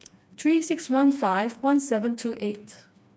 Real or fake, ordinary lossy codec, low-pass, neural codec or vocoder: fake; none; none; codec, 16 kHz, 2 kbps, FreqCodec, smaller model